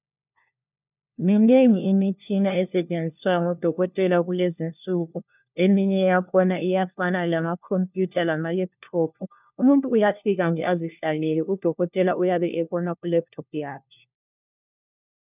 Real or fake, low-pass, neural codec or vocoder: fake; 3.6 kHz; codec, 16 kHz, 1 kbps, FunCodec, trained on LibriTTS, 50 frames a second